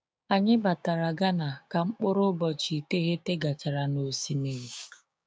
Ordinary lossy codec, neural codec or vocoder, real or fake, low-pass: none; codec, 16 kHz, 6 kbps, DAC; fake; none